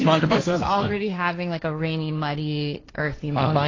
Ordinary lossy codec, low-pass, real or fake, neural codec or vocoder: AAC, 32 kbps; 7.2 kHz; fake; codec, 16 kHz, 1.1 kbps, Voila-Tokenizer